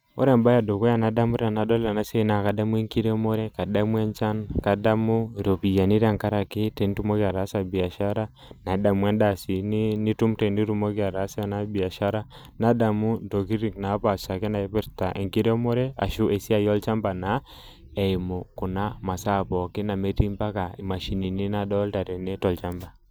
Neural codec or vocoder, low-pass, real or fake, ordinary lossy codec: none; none; real; none